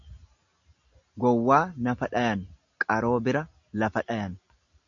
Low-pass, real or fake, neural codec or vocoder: 7.2 kHz; real; none